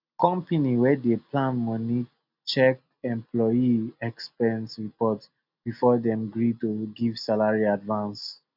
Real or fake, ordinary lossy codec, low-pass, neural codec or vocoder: real; none; 5.4 kHz; none